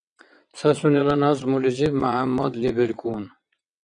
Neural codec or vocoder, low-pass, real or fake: vocoder, 22.05 kHz, 80 mel bands, WaveNeXt; 9.9 kHz; fake